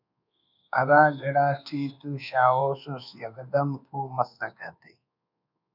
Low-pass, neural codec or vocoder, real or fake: 5.4 kHz; codec, 24 kHz, 1.2 kbps, DualCodec; fake